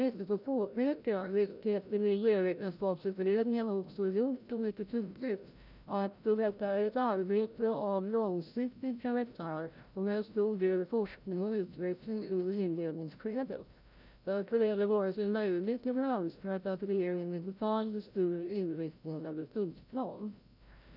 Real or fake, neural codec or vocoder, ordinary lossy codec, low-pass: fake; codec, 16 kHz, 0.5 kbps, FreqCodec, larger model; none; 5.4 kHz